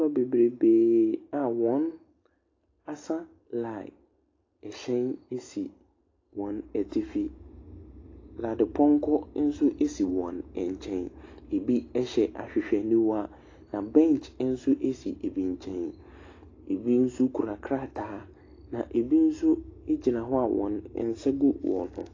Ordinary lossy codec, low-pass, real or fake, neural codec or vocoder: AAC, 32 kbps; 7.2 kHz; real; none